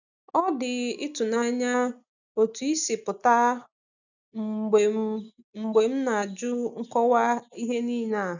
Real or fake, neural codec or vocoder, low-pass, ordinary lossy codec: real; none; 7.2 kHz; none